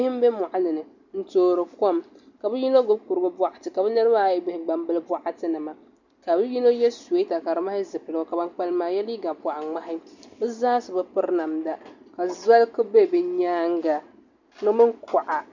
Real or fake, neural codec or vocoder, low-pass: real; none; 7.2 kHz